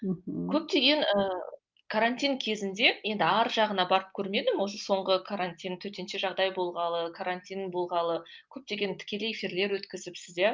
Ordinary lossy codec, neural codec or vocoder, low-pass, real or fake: Opus, 24 kbps; none; 7.2 kHz; real